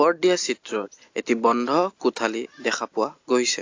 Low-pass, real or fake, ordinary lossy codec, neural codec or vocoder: 7.2 kHz; real; AAC, 48 kbps; none